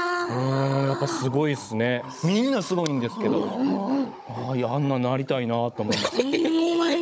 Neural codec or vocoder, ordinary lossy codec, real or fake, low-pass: codec, 16 kHz, 16 kbps, FunCodec, trained on Chinese and English, 50 frames a second; none; fake; none